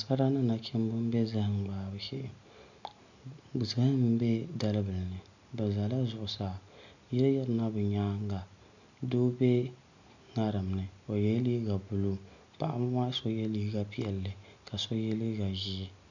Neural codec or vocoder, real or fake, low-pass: none; real; 7.2 kHz